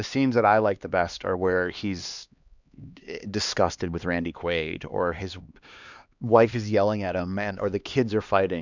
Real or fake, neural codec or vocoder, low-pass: fake; codec, 16 kHz, 2 kbps, X-Codec, HuBERT features, trained on LibriSpeech; 7.2 kHz